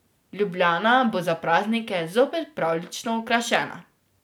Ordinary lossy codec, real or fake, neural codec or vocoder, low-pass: none; real; none; none